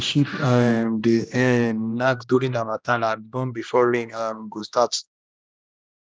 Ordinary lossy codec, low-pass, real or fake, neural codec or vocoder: none; none; fake; codec, 16 kHz, 1 kbps, X-Codec, HuBERT features, trained on general audio